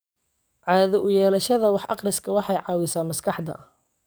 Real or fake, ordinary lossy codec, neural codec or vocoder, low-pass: fake; none; codec, 44.1 kHz, 7.8 kbps, DAC; none